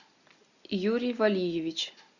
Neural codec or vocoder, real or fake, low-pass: none; real; 7.2 kHz